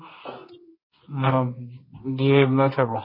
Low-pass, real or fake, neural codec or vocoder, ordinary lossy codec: 5.4 kHz; fake; codec, 24 kHz, 0.9 kbps, WavTokenizer, medium music audio release; MP3, 24 kbps